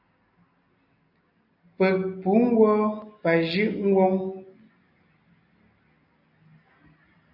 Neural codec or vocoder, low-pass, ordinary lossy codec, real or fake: none; 5.4 kHz; AAC, 48 kbps; real